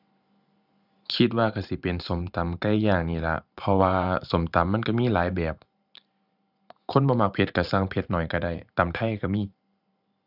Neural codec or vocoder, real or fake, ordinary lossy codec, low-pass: vocoder, 44.1 kHz, 128 mel bands every 512 samples, BigVGAN v2; fake; none; 5.4 kHz